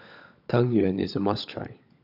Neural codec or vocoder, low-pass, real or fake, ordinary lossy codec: codec, 16 kHz, 16 kbps, FunCodec, trained on LibriTTS, 50 frames a second; 5.4 kHz; fake; none